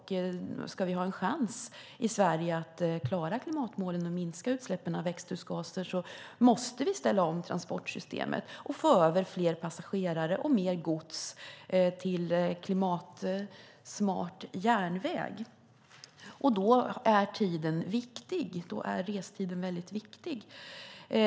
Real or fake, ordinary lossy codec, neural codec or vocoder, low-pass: real; none; none; none